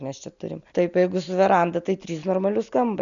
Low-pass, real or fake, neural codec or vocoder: 7.2 kHz; real; none